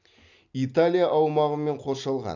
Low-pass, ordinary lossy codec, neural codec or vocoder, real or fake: 7.2 kHz; none; none; real